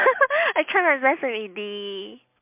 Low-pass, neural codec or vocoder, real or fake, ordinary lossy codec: 3.6 kHz; none; real; MP3, 32 kbps